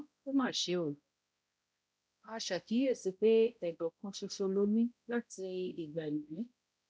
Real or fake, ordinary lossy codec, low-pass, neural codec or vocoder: fake; none; none; codec, 16 kHz, 0.5 kbps, X-Codec, HuBERT features, trained on balanced general audio